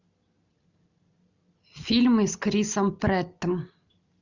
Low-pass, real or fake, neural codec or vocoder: 7.2 kHz; real; none